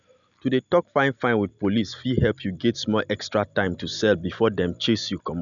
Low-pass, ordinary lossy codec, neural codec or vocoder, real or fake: 7.2 kHz; none; none; real